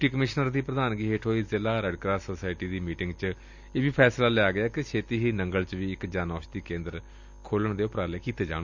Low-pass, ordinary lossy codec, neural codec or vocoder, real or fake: 7.2 kHz; none; none; real